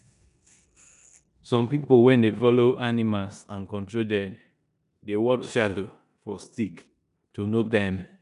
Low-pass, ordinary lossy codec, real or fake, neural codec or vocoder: 10.8 kHz; none; fake; codec, 16 kHz in and 24 kHz out, 0.9 kbps, LongCat-Audio-Codec, four codebook decoder